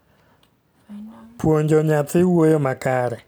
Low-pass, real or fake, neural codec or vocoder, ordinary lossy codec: none; real; none; none